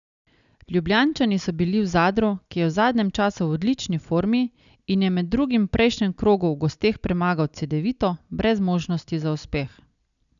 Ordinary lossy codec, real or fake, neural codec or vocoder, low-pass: none; real; none; 7.2 kHz